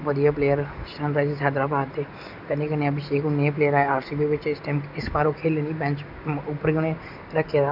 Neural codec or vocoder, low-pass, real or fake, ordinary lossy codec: none; 5.4 kHz; real; none